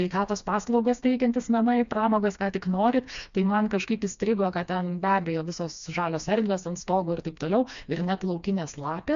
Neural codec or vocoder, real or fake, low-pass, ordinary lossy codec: codec, 16 kHz, 2 kbps, FreqCodec, smaller model; fake; 7.2 kHz; MP3, 64 kbps